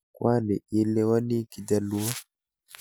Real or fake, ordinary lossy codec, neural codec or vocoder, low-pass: real; none; none; none